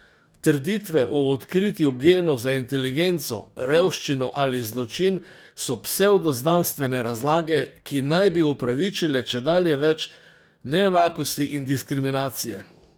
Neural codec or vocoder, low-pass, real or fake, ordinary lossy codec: codec, 44.1 kHz, 2.6 kbps, DAC; none; fake; none